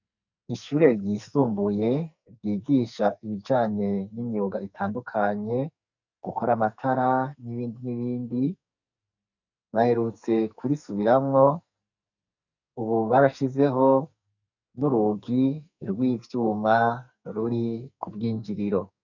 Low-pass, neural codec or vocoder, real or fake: 7.2 kHz; codec, 44.1 kHz, 2.6 kbps, SNAC; fake